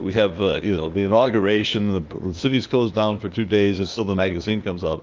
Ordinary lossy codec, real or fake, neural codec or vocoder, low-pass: Opus, 24 kbps; fake; codec, 16 kHz, 0.8 kbps, ZipCodec; 7.2 kHz